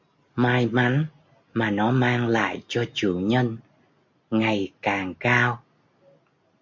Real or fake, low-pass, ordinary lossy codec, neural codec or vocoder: real; 7.2 kHz; MP3, 48 kbps; none